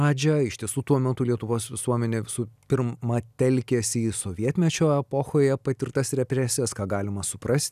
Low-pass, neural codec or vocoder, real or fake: 14.4 kHz; none; real